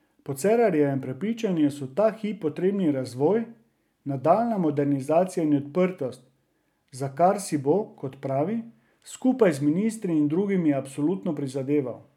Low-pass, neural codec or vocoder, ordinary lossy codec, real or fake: 19.8 kHz; none; none; real